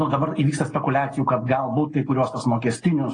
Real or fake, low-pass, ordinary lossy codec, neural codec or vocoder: real; 9.9 kHz; AAC, 32 kbps; none